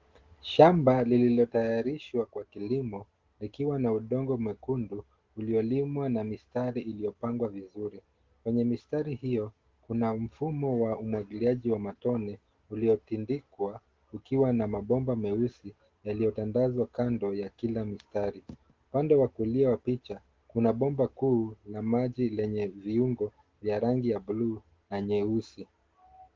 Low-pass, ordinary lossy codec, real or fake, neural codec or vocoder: 7.2 kHz; Opus, 16 kbps; real; none